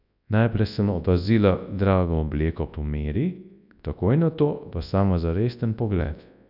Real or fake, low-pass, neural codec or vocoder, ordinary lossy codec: fake; 5.4 kHz; codec, 24 kHz, 0.9 kbps, WavTokenizer, large speech release; none